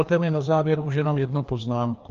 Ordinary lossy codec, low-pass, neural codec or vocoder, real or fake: Opus, 32 kbps; 7.2 kHz; codec, 16 kHz, 2 kbps, FreqCodec, larger model; fake